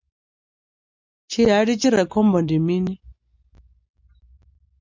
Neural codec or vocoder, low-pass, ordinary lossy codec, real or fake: none; 7.2 kHz; MP3, 64 kbps; real